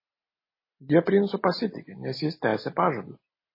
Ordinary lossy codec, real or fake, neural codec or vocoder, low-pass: MP3, 24 kbps; real; none; 5.4 kHz